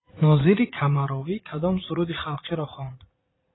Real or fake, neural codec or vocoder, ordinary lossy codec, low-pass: fake; vocoder, 44.1 kHz, 80 mel bands, Vocos; AAC, 16 kbps; 7.2 kHz